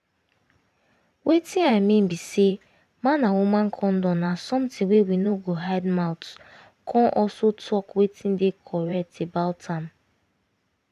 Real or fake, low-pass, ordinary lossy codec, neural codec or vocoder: fake; 14.4 kHz; none; vocoder, 44.1 kHz, 128 mel bands every 512 samples, BigVGAN v2